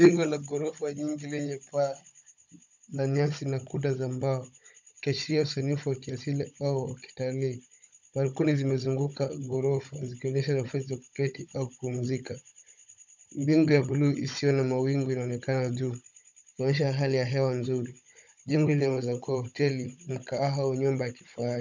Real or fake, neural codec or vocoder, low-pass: fake; codec, 16 kHz, 16 kbps, FunCodec, trained on Chinese and English, 50 frames a second; 7.2 kHz